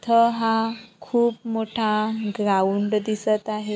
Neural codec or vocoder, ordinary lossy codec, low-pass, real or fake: none; none; none; real